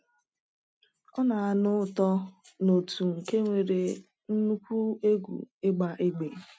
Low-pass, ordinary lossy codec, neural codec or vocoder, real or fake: none; none; none; real